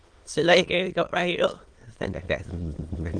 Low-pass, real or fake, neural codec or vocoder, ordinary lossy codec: 9.9 kHz; fake; autoencoder, 22.05 kHz, a latent of 192 numbers a frame, VITS, trained on many speakers; Opus, 24 kbps